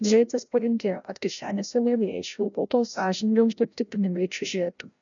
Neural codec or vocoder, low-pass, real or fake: codec, 16 kHz, 0.5 kbps, FreqCodec, larger model; 7.2 kHz; fake